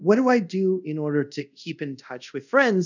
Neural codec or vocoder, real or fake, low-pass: codec, 24 kHz, 0.5 kbps, DualCodec; fake; 7.2 kHz